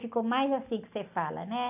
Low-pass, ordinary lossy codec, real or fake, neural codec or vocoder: 3.6 kHz; AAC, 32 kbps; real; none